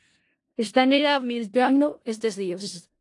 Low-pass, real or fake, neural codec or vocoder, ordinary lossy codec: 10.8 kHz; fake; codec, 16 kHz in and 24 kHz out, 0.4 kbps, LongCat-Audio-Codec, four codebook decoder; AAC, 48 kbps